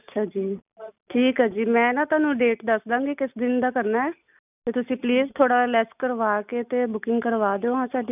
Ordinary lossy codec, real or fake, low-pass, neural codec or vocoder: none; real; 3.6 kHz; none